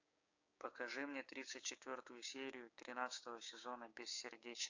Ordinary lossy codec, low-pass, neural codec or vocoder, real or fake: AAC, 48 kbps; 7.2 kHz; codec, 16 kHz, 6 kbps, DAC; fake